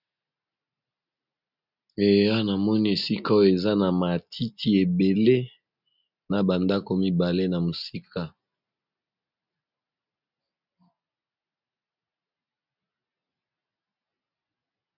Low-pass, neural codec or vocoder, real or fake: 5.4 kHz; none; real